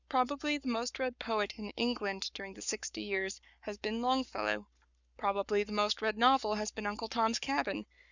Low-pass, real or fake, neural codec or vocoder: 7.2 kHz; fake; codec, 44.1 kHz, 7.8 kbps, Pupu-Codec